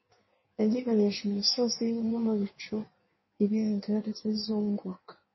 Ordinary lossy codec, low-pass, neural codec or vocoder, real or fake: MP3, 24 kbps; 7.2 kHz; codec, 16 kHz in and 24 kHz out, 1.1 kbps, FireRedTTS-2 codec; fake